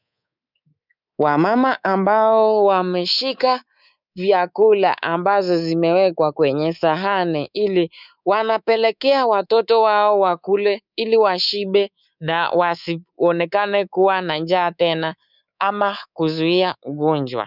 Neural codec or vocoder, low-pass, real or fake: codec, 24 kHz, 3.1 kbps, DualCodec; 5.4 kHz; fake